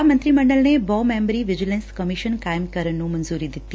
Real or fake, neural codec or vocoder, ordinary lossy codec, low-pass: real; none; none; none